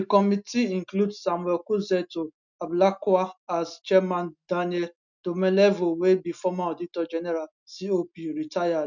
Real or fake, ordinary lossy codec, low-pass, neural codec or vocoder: real; none; 7.2 kHz; none